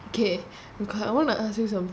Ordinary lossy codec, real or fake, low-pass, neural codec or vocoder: none; real; none; none